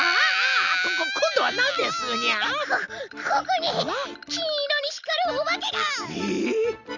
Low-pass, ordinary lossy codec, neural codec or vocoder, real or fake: 7.2 kHz; none; none; real